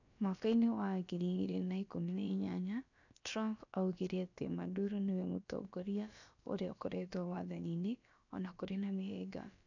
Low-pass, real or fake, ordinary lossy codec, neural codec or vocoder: 7.2 kHz; fake; none; codec, 16 kHz, about 1 kbps, DyCAST, with the encoder's durations